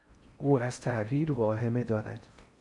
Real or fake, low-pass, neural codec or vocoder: fake; 10.8 kHz; codec, 16 kHz in and 24 kHz out, 0.6 kbps, FocalCodec, streaming, 4096 codes